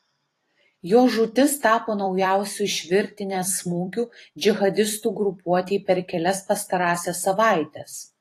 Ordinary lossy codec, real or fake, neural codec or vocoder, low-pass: AAC, 48 kbps; fake; vocoder, 44.1 kHz, 128 mel bands every 256 samples, BigVGAN v2; 14.4 kHz